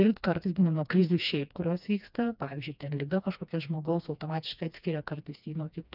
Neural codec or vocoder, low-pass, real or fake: codec, 16 kHz, 2 kbps, FreqCodec, smaller model; 5.4 kHz; fake